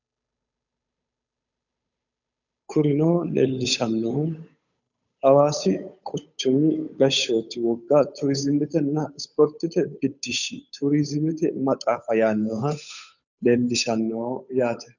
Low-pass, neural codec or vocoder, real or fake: 7.2 kHz; codec, 16 kHz, 8 kbps, FunCodec, trained on Chinese and English, 25 frames a second; fake